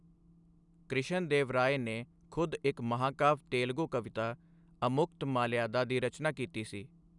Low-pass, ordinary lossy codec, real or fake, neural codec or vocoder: 10.8 kHz; none; real; none